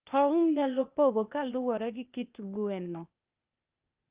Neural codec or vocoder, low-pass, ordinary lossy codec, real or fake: codec, 16 kHz, 0.8 kbps, ZipCodec; 3.6 kHz; Opus, 24 kbps; fake